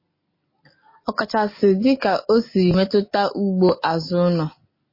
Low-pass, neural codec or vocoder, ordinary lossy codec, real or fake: 5.4 kHz; none; MP3, 24 kbps; real